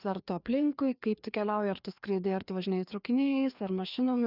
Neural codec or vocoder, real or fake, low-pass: codec, 16 kHz, 2 kbps, FreqCodec, larger model; fake; 5.4 kHz